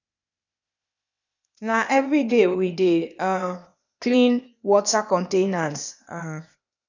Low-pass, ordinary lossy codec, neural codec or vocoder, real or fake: 7.2 kHz; none; codec, 16 kHz, 0.8 kbps, ZipCodec; fake